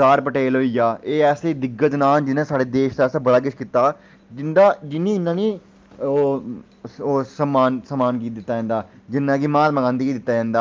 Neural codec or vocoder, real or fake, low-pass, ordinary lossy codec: none; real; 7.2 kHz; Opus, 24 kbps